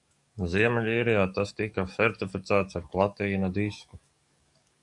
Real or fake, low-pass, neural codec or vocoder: fake; 10.8 kHz; codec, 44.1 kHz, 7.8 kbps, DAC